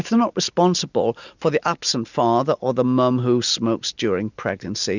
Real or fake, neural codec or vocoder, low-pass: real; none; 7.2 kHz